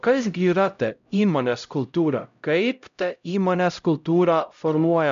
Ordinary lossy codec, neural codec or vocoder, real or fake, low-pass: MP3, 64 kbps; codec, 16 kHz, 0.5 kbps, X-Codec, HuBERT features, trained on LibriSpeech; fake; 7.2 kHz